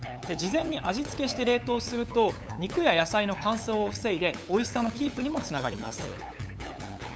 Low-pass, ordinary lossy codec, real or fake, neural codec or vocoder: none; none; fake; codec, 16 kHz, 8 kbps, FunCodec, trained on LibriTTS, 25 frames a second